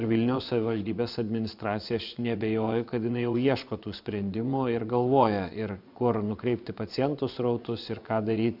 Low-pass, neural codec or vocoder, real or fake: 5.4 kHz; vocoder, 24 kHz, 100 mel bands, Vocos; fake